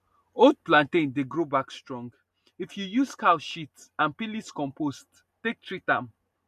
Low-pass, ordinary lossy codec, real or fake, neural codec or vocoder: 14.4 kHz; MP3, 64 kbps; real; none